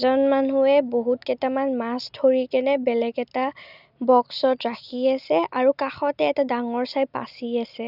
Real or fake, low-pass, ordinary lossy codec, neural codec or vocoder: real; 5.4 kHz; none; none